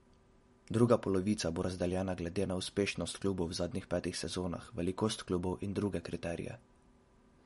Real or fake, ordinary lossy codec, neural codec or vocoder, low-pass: real; MP3, 48 kbps; none; 19.8 kHz